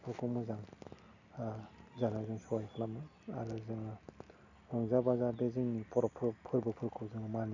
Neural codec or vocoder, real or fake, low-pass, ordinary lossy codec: none; real; 7.2 kHz; none